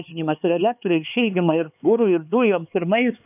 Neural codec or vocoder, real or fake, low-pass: codec, 16 kHz, 4 kbps, X-Codec, HuBERT features, trained on LibriSpeech; fake; 3.6 kHz